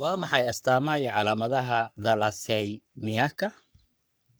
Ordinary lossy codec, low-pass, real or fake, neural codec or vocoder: none; none; fake; codec, 44.1 kHz, 3.4 kbps, Pupu-Codec